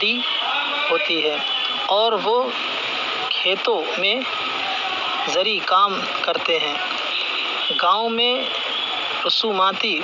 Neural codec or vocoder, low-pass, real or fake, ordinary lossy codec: none; 7.2 kHz; real; none